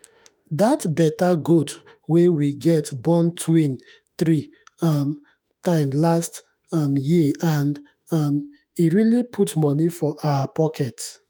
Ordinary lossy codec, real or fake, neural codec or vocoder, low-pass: none; fake; autoencoder, 48 kHz, 32 numbers a frame, DAC-VAE, trained on Japanese speech; none